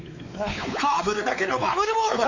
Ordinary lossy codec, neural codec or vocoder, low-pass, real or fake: none; codec, 16 kHz, 4 kbps, X-Codec, WavLM features, trained on Multilingual LibriSpeech; 7.2 kHz; fake